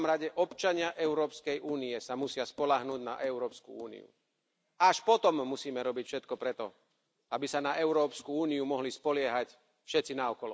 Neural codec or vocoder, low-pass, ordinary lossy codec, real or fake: none; none; none; real